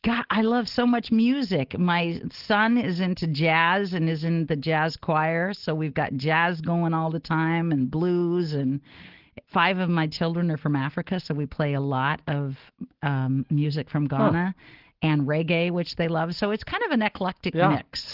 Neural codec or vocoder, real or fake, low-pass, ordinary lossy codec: none; real; 5.4 kHz; Opus, 16 kbps